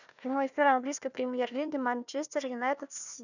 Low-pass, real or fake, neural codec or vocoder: 7.2 kHz; fake; codec, 16 kHz, 1 kbps, FunCodec, trained on Chinese and English, 50 frames a second